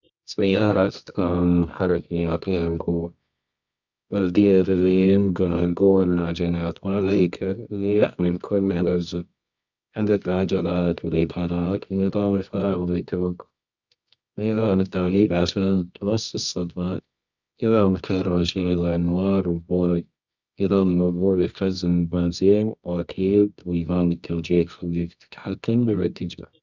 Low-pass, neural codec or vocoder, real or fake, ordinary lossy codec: 7.2 kHz; codec, 24 kHz, 0.9 kbps, WavTokenizer, medium music audio release; fake; none